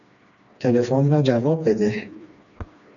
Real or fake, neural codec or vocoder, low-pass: fake; codec, 16 kHz, 2 kbps, FreqCodec, smaller model; 7.2 kHz